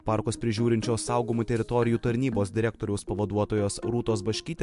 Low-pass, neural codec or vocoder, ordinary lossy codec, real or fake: 10.8 kHz; none; MP3, 64 kbps; real